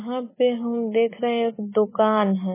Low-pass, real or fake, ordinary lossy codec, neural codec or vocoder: 3.6 kHz; real; MP3, 16 kbps; none